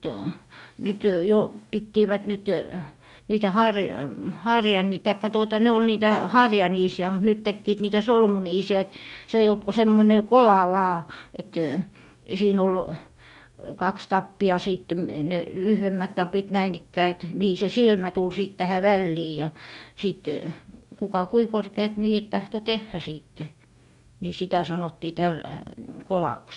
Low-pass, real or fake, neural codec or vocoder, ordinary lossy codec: 10.8 kHz; fake; codec, 44.1 kHz, 2.6 kbps, DAC; none